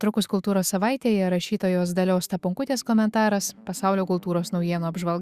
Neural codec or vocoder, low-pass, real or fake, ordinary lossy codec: autoencoder, 48 kHz, 128 numbers a frame, DAC-VAE, trained on Japanese speech; 14.4 kHz; fake; Opus, 64 kbps